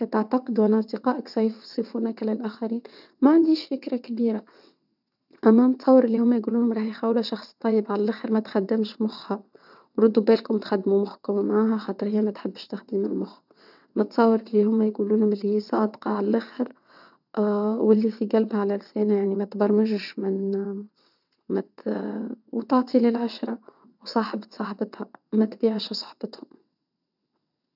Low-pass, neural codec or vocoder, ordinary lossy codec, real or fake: 5.4 kHz; vocoder, 22.05 kHz, 80 mel bands, WaveNeXt; none; fake